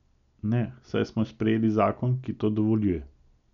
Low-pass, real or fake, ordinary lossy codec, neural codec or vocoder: 7.2 kHz; real; none; none